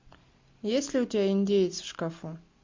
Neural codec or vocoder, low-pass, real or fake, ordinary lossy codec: none; 7.2 kHz; real; MP3, 48 kbps